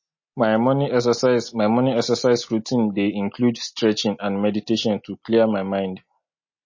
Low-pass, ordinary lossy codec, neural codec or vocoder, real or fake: 7.2 kHz; MP3, 32 kbps; none; real